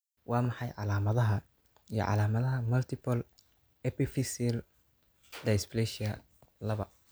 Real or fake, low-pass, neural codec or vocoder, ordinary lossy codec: real; none; none; none